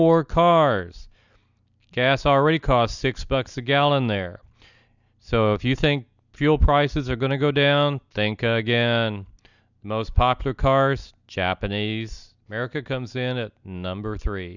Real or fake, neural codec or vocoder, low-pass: real; none; 7.2 kHz